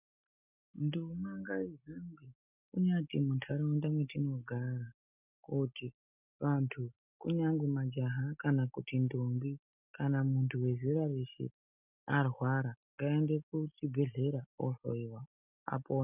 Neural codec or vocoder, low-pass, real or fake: none; 3.6 kHz; real